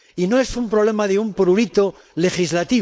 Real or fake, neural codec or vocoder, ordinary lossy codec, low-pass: fake; codec, 16 kHz, 4.8 kbps, FACodec; none; none